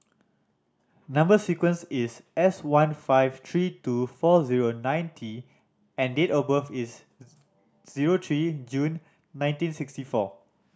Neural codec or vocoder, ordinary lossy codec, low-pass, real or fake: none; none; none; real